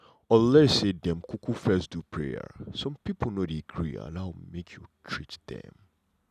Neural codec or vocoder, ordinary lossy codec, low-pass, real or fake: none; none; 14.4 kHz; real